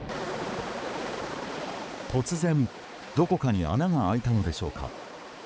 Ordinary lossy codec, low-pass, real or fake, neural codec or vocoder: none; none; fake; codec, 16 kHz, 4 kbps, X-Codec, HuBERT features, trained on balanced general audio